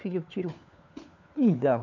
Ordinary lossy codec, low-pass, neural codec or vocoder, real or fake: none; 7.2 kHz; codec, 16 kHz, 4 kbps, FunCodec, trained on LibriTTS, 50 frames a second; fake